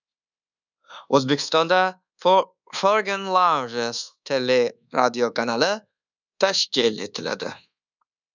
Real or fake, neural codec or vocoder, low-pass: fake; codec, 24 kHz, 1.2 kbps, DualCodec; 7.2 kHz